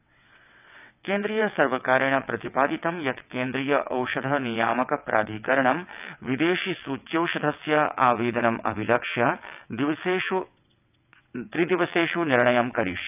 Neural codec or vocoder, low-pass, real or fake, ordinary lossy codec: vocoder, 22.05 kHz, 80 mel bands, WaveNeXt; 3.6 kHz; fake; none